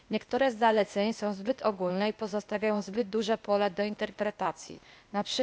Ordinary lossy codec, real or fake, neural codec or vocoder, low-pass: none; fake; codec, 16 kHz, 0.8 kbps, ZipCodec; none